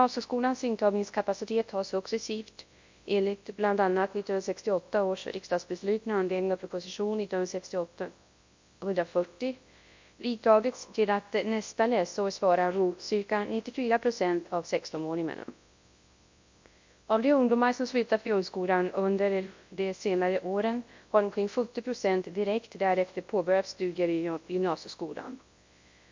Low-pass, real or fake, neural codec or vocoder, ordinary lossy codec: 7.2 kHz; fake; codec, 24 kHz, 0.9 kbps, WavTokenizer, large speech release; MP3, 48 kbps